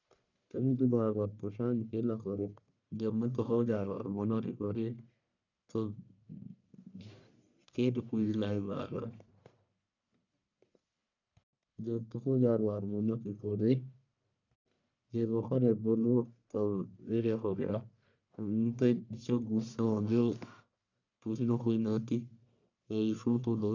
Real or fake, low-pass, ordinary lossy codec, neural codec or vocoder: fake; 7.2 kHz; none; codec, 44.1 kHz, 1.7 kbps, Pupu-Codec